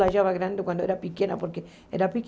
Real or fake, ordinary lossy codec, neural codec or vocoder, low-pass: real; none; none; none